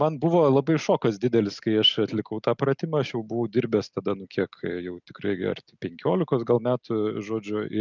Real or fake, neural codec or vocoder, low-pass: real; none; 7.2 kHz